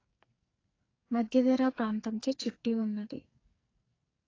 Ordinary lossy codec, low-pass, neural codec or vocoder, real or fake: AAC, 32 kbps; 7.2 kHz; codec, 44.1 kHz, 2.6 kbps, SNAC; fake